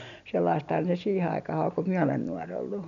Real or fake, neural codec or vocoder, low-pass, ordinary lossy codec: real; none; 7.2 kHz; none